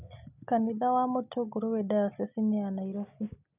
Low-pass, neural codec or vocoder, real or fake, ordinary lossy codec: 3.6 kHz; none; real; none